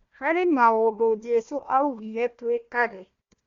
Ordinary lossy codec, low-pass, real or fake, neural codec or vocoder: Opus, 64 kbps; 7.2 kHz; fake; codec, 16 kHz, 1 kbps, FunCodec, trained on Chinese and English, 50 frames a second